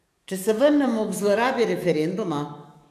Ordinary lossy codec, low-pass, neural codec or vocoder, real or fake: MP3, 96 kbps; 14.4 kHz; codec, 44.1 kHz, 7.8 kbps, DAC; fake